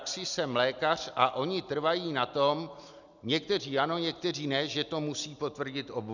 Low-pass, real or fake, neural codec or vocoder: 7.2 kHz; real; none